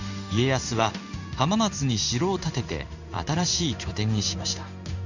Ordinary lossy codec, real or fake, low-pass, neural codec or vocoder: none; fake; 7.2 kHz; codec, 16 kHz in and 24 kHz out, 1 kbps, XY-Tokenizer